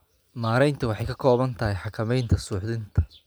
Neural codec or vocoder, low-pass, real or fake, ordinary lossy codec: none; none; real; none